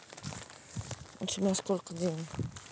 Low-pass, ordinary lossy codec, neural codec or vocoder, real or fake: none; none; none; real